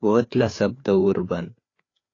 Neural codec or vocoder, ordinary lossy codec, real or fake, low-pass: codec, 16 kHz, 4 kbps, FreqCodec, larger model; AAC, 32 kbps; fake; 7.2 kHz